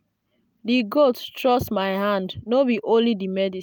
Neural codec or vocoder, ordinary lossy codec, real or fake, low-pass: none; none; real; none